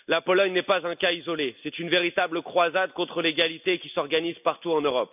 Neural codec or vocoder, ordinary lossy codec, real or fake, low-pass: none; none; real; 3.6 kHz